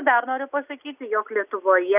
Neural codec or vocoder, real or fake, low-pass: none; real; 3.6 kHz